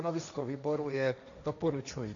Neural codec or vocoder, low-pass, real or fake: codec, 16 kHz, 1.1 kbps, Voila-Tokenizer; 7.2 kHz; fake